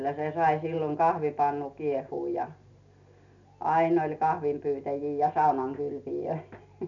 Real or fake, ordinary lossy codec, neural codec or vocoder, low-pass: real; none; none; 7.2 kHz